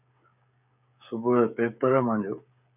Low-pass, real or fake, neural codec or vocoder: 3.6 kHz; fake; codec, 16 kHz, 16 kbps, FreqCodec, smaller model